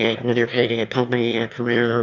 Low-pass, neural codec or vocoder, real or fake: 7.2 kHz; autoencoder, 22.05 kHz, a latent of 192 numbers a frame, VITS, trained on one speaker; fake